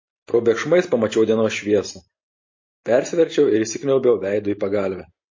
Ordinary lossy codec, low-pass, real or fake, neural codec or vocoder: MP3, 32 kbps; 7.2 kHz; real; none